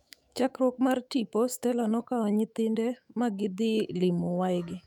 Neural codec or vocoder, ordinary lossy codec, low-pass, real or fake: autoencoder, 48 kHz, 128 numbers a frame, DAC-VAE, trained on Japanese speech; none; 19.8 kHz; fake